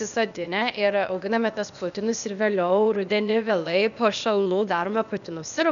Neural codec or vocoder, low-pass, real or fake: codec, 16 kHz, 0.8 kbps, ZipCodec; 7.2 kHz; fake